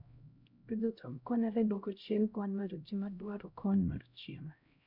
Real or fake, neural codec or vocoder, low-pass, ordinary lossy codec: fake; codec, 16 kHz, 0.5 kbps, X-Codec, HuBERT features, trained on LibriSpeech; 5.4 kHz; none